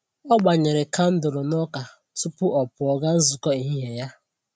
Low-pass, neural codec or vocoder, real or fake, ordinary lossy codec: none; none; real; none